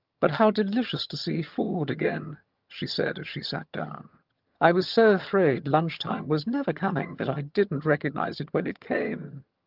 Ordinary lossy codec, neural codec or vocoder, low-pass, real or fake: Opus, 32 kbps; vocoder, 22.05 kHz, 80 mel bands, HiFi-GAN; 5.4 kHz; fake